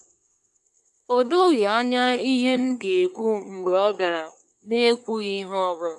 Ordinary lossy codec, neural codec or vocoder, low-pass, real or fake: none; codec, 24 kHz, 1 kbps, SNAC; none; fake